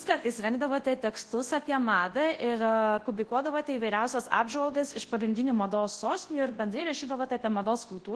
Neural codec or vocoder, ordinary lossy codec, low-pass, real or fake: codec, 24 kHz, 0.9 kbps, WavTokenizer, large speech release; Opus, 16 kbps; 10.8 kHz; fake